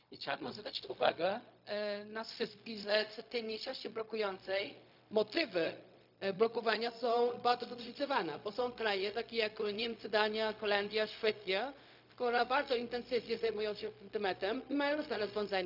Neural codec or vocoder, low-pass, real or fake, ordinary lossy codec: codec, 16 kHz, 0.4 kbps, LongCat-Audio-Codec; 5.4 kHz; fake; none